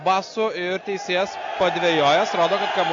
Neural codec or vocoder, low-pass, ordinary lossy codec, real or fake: none; 7.2 kHz; AAC, 48 kbps; real